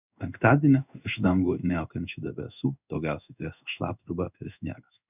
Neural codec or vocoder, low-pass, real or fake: codec, 16 kHz in and 24 kHz out, 1 kbps, XY-Tokenizer; 3.6 kHz; fake